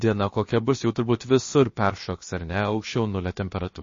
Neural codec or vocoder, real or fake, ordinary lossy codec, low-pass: codec, 16 kHz, 0.7 kbps, FocalCodec; fake; MP3, 32 kbps; 7.2 kHz